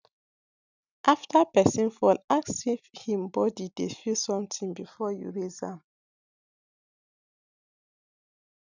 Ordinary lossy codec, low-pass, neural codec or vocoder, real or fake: none; 7.2 kHz; none; real